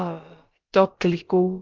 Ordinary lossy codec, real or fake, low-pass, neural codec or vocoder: Opus, 24 kbps; fake; 7.2 kHz; codec, 16 kHz, about 1 kbps, DyCAST, with the encoder's durations